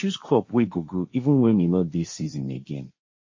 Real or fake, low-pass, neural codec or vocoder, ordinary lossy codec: fake; 7.2 kHz; codec, 16 kHz, 1.1 kbps, Voila-Tokenizer; MP3, 32 kbps